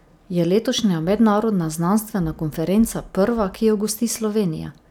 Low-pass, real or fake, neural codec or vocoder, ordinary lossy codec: 19.8 kHz; real; none; none